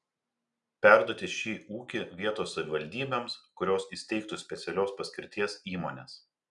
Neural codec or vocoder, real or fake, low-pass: none; real; 10.8 kHz